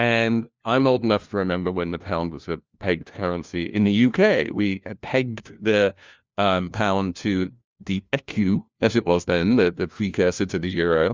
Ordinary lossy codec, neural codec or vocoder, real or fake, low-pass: Opus, 32 kbps; codec, 16 kHz, 1 kbps, FunCodec, trained on LibriTTS, 50 frames a second; fake; 7.2 kHz